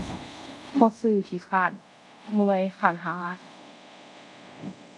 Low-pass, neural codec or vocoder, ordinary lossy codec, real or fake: none; codec, 24 kHz, 0.5 kbps, DualCodec; none; fake